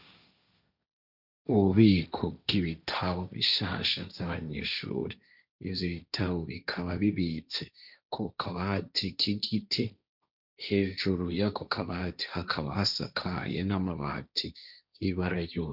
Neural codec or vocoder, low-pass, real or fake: codec, 16 kHz, 1.1 kbps, Voila-Tokenizer; 5.4 kHz; fake